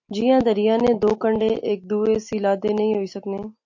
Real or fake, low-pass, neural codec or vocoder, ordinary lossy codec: real; 7.2 kHz; none; MP3, 48 kbps